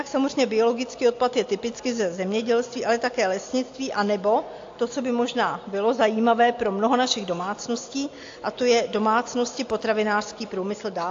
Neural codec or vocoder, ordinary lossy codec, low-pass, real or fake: none; AAC, 48 kbps; 7.2 kHz; real